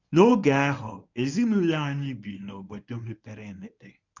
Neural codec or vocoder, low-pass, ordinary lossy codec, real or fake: codec, 24 kHz, 0.9 kbps, WavTokenizer, medium speech release version 1; 7.2 kHz; none; fake